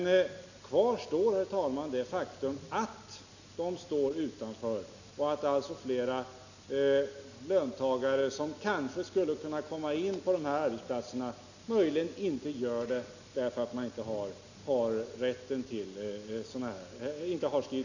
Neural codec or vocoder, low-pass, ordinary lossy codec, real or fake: none; 7.2 kHz; none; real